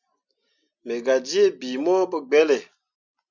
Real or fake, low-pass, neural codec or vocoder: real; 7.2 kHz; none